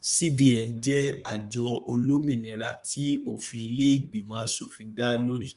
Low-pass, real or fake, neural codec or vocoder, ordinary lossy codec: 10.8 kHz; fake; codec, 24 kHz, 1 kbps, SNAC; none